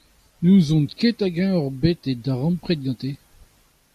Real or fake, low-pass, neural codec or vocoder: real; 14.4 kHz; none